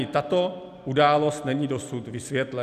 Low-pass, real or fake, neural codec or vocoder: 14.4 kHz; real; none